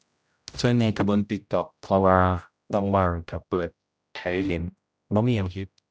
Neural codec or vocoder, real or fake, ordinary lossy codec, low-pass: codec, 16 kHz, 0.5 kbps, X-Codec, HuBERT features, trained on general audio; fake; none; none